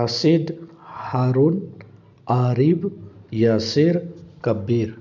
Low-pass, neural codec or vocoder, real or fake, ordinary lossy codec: 7.2 kHz; none; real; none